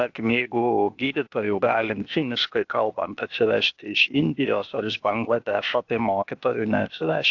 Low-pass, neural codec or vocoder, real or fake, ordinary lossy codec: 7.2 kHz; codec, 16 kHz, 0.8 kbps, ZipCodec; fake; AAC, 48 kbps